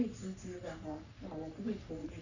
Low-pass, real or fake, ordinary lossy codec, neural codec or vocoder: 7.2 kHz; fake; none; codec, 44.1 kHz, 3.4 kbps, Pupu-Codec